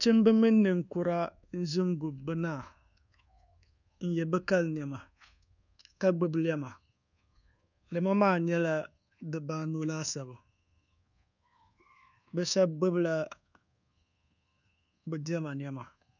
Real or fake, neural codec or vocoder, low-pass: fake; codec, 24 kHz, 1.2 kbps, DualCodec; 7.2 kHz